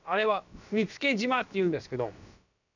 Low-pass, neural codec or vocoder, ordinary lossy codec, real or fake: 7.2 kHz; codec, 16 kHz, about 1 kbps, DyCAST, with the encoder's durations; none; fake